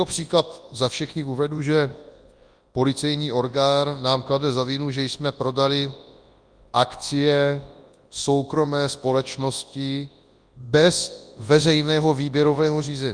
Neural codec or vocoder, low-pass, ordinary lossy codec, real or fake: codec, 24 kHz, 0.9 kbps, WavTokenizer, large speech release; 9.9 kHz; Opus, 32 kbps; fake